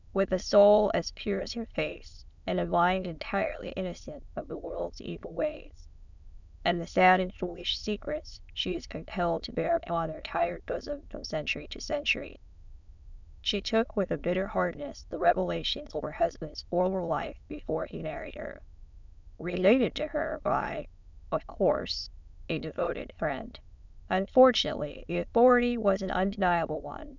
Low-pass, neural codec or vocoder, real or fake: 7.2 kHz; autoencoder, 22.05 kHz, a latent of 192 numbers a frame, VITS, trained on many speakers; fake